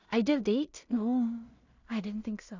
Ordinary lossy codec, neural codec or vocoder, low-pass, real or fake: none; codec, 16 kHz in and 24 kHz out, 0.4 kbps, LongCat-Audio-Codec, two codebook decoder; 7.2 kHz; fake